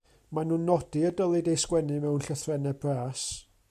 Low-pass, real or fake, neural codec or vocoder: 14.4 kHz; real; none